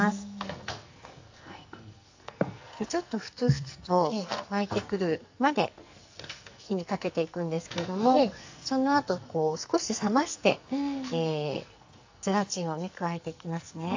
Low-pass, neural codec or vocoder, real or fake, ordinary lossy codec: 7.2 kHz; codec, 44.1 kHz, 2.6 kbps, SNAC; fake; AAC, 48 kbps